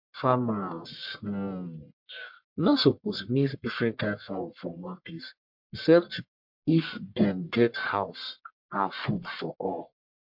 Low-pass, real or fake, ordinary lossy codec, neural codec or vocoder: 5.4 kHz; fake; MP3, 48 kbps; codec, 44.1 kHz, 1.7 kbps, Pupu-Codec